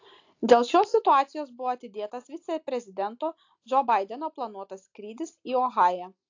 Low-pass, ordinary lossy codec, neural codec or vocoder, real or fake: 7.2 kHz; AAC, 48 kbps; none; real